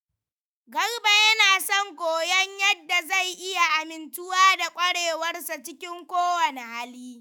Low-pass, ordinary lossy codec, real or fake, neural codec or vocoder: none; none; fake; autoencoder, 48 kHz, 128 numbers a frame, DAC-VAE, trained on Japanese speech